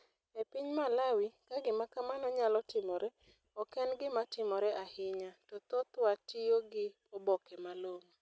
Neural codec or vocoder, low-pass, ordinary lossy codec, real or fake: none; none; none; real